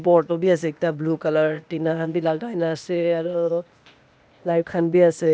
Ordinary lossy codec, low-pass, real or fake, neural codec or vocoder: none; none; fake; codec, 16 kHz, 0.8 kbps, ZipCodec